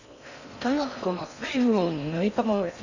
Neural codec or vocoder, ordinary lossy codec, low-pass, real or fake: codec, 16 kHz in and 24 kHz out, 0.6 kbps, FocalCodec, streaming, 4096 codes; none; 7.2 kHz; fake